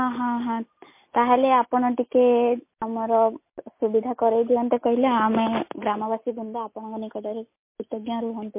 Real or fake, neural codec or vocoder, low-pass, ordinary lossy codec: real; none; 3.6 kHz; MP3, 24 kbps